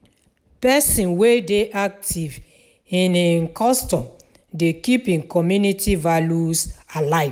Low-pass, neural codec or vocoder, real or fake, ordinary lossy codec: none; none; real; none